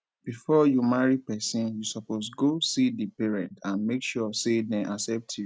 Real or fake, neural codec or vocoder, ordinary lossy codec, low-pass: real; none; none; none